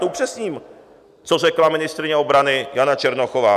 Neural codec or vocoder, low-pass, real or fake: none; 14.4 kHz; real